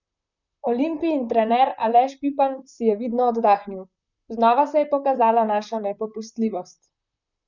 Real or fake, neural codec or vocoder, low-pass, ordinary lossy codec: fake; vocoder, 44.1 kHz, 128 mel bands, Pupu-Vocoder; 7.2 kHz; none